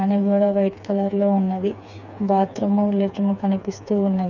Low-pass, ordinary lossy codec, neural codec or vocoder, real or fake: 7.2 kHz; none; codec, 16 kHz, 4 kbps, FreqCodec, smaller model; fake